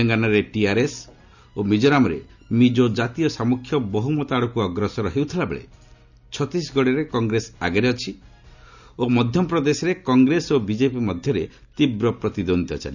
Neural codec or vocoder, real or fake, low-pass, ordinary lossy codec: none; real; 7.2 kHz; none